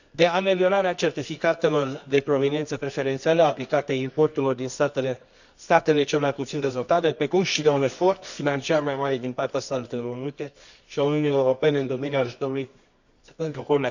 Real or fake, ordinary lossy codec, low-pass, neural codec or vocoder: fake; none; 7.2 kHz; codec, 24 kHz, 0.9 kbps, WavTokenizer, medium music audio release